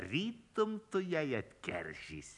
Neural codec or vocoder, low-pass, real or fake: none; 10.8 kHz; real